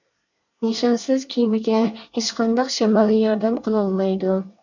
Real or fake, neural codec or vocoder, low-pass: fake; codec, 24 kHz, 1 kbps, SNAC; 7.2 kHz